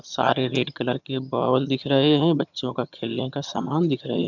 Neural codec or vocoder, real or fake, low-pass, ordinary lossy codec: vocoder, 22.05 kHz, 80 mel bands, HiFi-GAN; fake; 7.2 kHz; none